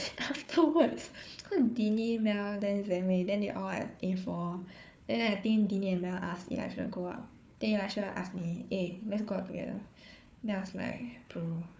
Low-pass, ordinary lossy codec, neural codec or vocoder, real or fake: none; none; codec, 16 kHz, 4 kbps, FunCodec, trained on Chinese and English, 50 frames a second; fake